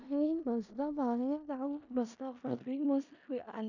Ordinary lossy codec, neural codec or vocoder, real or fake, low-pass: none; codec, 16 kHz in and 24 kHz out, 0.4 kbps, LongCat-Audio-Codec, four codebook decoder; fake; 7.2 kHz